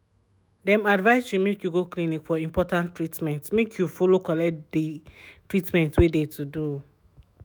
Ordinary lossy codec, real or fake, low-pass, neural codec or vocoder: none; fake; none; autoencoder, 48 kHz, 128 numbers a frame, DAC-VAE, trained on Japanese speech